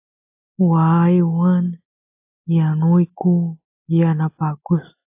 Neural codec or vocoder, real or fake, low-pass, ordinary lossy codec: none; real; 3.6 kHz; AAC, 24 kbps